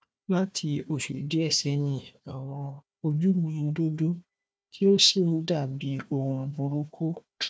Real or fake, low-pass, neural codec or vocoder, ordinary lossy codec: fake; none; codec, 16 kHz, 1 kbps, FunCodec, trained on Chinese and English, 50 frames a second; none